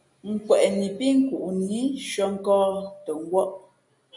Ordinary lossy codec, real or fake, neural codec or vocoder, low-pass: MP3, 48 kbps; real; none; 10.8 kHz